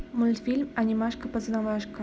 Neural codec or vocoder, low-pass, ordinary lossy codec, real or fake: none; none; none; real